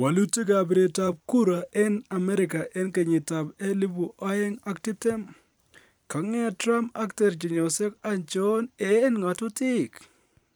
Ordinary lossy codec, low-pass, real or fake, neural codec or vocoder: none; none; fake; vocoder, 44.1 kHz, 128 mel bands every 512 samples, BigVGAN v2